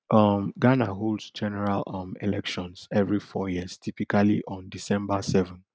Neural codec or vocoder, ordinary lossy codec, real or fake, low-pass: none; none; real; none